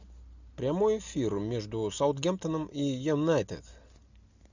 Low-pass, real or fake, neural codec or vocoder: 7.2 kHz; real; none